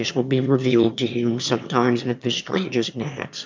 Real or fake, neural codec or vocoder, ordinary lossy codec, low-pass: fake; autoencoder, 22.05 kHz, a latent of 192 numbers a frame, VITS, trained on one speaker; MP3, 64 kbps; 7.2 kHz